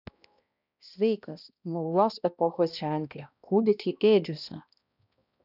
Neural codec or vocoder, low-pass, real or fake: codec, 16 kHz, 1 kbps, X-Codec, HuBERT features, trained on balanced general audio; 5.4 kHz; fake